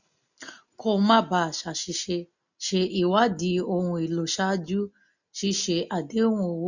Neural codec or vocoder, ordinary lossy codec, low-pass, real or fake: none; none; 7.2 kHz; real